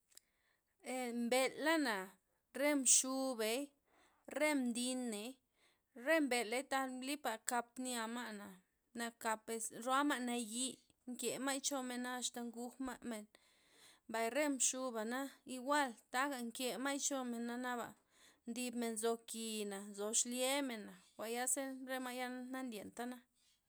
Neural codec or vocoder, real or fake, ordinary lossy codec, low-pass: none; real; none; none